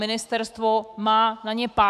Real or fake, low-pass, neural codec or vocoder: fake; 14.4 kHz; autoencoder, 48 kHz, 128 numbers a frame, DAC-VAE, trained on Japanese speech